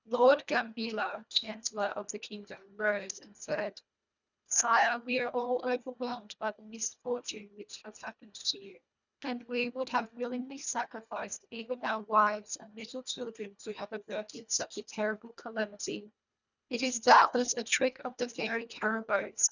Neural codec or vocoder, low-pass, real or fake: codec, 24 kHz, 1.5 kbps, HILCodec; 7.2 kHz; fake